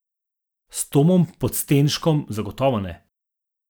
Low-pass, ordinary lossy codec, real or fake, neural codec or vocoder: none; none; real; none